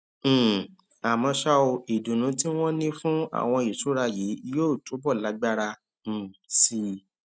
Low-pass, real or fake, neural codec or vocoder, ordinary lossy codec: none; real; none; none